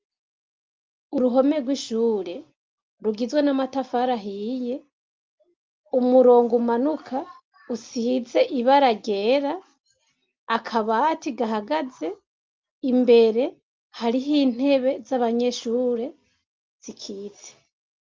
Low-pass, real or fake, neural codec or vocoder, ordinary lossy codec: 7.2 kHz; real; none; Opus, 24 kbps